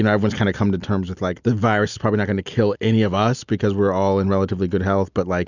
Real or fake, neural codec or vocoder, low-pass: real; none; 7.2 kHz